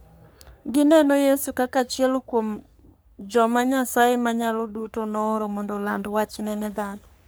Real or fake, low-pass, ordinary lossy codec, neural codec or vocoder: fake; none; none; codec, 44.1 kHz, 3.4 kbps, Pupu-Codec